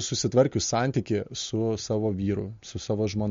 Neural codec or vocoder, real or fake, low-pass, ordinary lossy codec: none; real; 7.2 kHz; MP3, 48 kbps